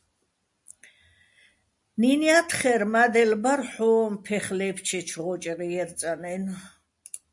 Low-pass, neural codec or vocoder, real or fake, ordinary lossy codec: 10.8 kHz; none; real; MP3, 64 kbps